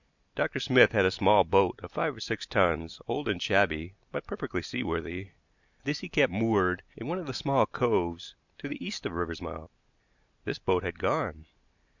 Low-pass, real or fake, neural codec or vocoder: 7.2 kHz; real; none